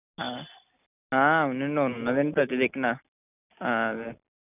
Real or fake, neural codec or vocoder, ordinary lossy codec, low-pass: real; none; none; 3.6 kHz